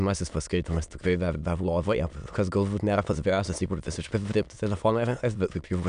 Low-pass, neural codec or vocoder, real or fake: 9.9 kHz; autoencoder, 22.05 kHz, a latent of 192 numbers a frame, VITS, trained on many speakers; fake